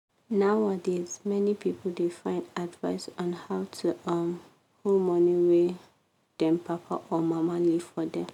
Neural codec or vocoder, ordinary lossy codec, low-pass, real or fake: none; none; 19.8 kHz; real